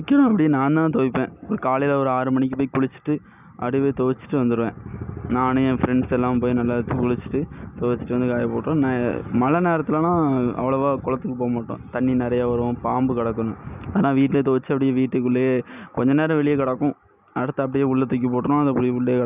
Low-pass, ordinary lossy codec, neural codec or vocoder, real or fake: 3.6 kHz; none; none; real